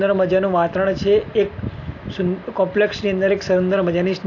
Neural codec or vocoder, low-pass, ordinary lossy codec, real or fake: none; 7.2 kHz; AAC, 48 kbps; real